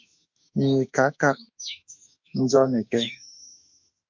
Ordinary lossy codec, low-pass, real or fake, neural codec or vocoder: MP3, 64 kbps; 7.2 kHz; fake; autoencoder, 48 kHz, 32 numbers a frame, DAC-VAE, trained on Japanese speech